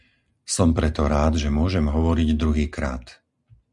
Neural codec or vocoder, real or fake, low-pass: none; real; 10.8 kHz